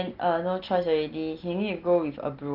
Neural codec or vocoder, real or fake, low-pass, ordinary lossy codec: none; real; 5.4 kHz; Opus, 16 kbps